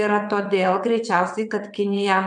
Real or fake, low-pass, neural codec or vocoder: fake; 9.9 kHz; vocoder, 22.05 kHz, 80 mel bands, WaveNeXt